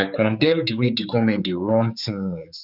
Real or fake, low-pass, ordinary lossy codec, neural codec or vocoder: fake; 5.4 kHz; none; codec, 16 kHz, 4 kbps, X-Codec, HuBERT features, trained on general audio